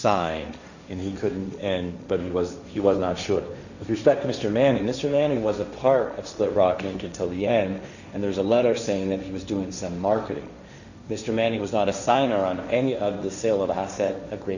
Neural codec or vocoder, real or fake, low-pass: codec, 16 kHz, 1.1 kbps, Voila-Tokenizer; fake; 7.2 kHz